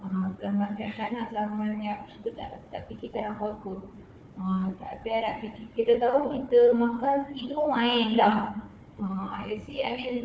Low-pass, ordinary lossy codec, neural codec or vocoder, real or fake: none; none; codec, 16 kHz, 4 kbps, FunCodec, trained on Chinese and English, 50 frames a second; fake